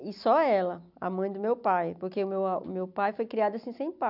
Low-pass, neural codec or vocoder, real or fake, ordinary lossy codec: 5.4 kHz; none; real; none